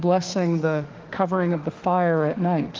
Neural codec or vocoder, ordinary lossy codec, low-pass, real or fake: autoencoder, 48 kHz, 32 numbers a frame, DAC-VAE, trained on Japanese speech; Opus, 16 kbps; 7.2 kHz; fake